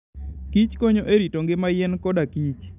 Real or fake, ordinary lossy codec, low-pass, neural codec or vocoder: real; none; 3.6 kHz; none